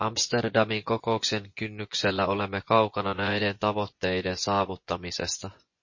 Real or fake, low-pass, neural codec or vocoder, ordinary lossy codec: fake; 7.2 kHz; vocoder, 24 kHz, 100 mel bands, Vocos; MP3, 32 kbps